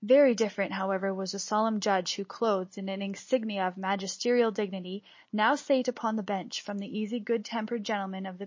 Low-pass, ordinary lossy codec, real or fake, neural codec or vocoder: 7.2 kHz; MP3, 32 kbps; real; none